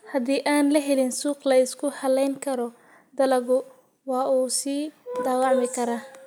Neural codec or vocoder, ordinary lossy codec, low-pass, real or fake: none; none; none; real